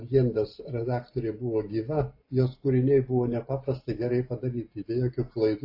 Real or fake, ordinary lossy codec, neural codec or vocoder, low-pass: real; AAC, 32 kbps; none; 5.4 kHz